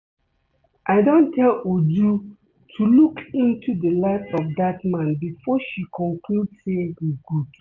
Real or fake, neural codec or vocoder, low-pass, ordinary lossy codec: real; none; 7.2 kHz; none